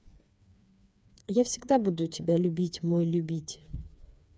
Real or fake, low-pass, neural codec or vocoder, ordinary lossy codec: fake; none; codec, 16 kHz, 4 kbps, FreqCodec, smaller model; none